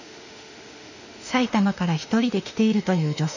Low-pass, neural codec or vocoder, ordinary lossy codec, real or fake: 7.2 kHz; autoencoder, 48 kHz, 32 numbers a frame, DAC-VAE, trained on Japanese speech; none; fake